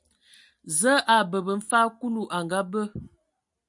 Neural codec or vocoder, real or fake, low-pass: none; real; 10.8 kHz